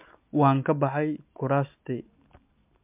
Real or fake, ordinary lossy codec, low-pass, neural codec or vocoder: real; MP3, 32 kbps; 3.6 kHz; none